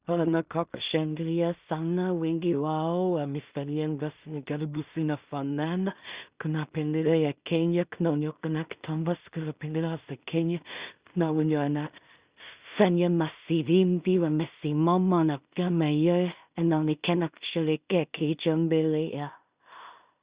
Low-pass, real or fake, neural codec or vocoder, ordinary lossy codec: 3.6 kHz; fake; codec, 16 kHz in and 24 kHz out, 0.4 kbps, LongCat-Audio-Codec, two codebook decoder; Opus, 64 kbps